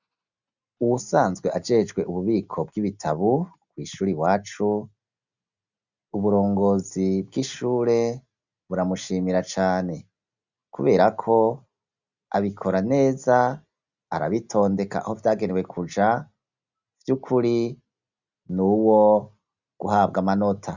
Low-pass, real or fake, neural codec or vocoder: 7.2 kHz; real; none